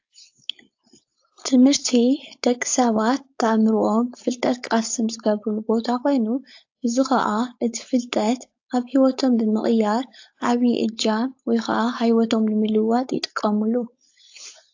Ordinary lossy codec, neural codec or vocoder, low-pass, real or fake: AAC, 48 kbps; codec, 16 kHz, 4.8 kbps, FACodec; 7.2 kHz; fake